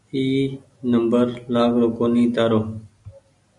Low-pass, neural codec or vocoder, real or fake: 10.8 kHz; none; real